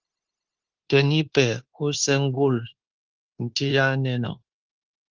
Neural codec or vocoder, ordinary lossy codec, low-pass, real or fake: codec, 16 kHz, 0.9 kbps, LongCat-Audio-Codec; Opus, 16 kbps; 7.2 kHz; fake